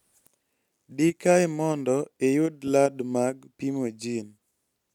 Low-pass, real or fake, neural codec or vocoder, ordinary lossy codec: 19.8 kHz; real; none; none